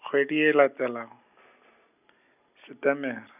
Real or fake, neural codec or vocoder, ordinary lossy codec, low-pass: real; none; none; 3.6 kHz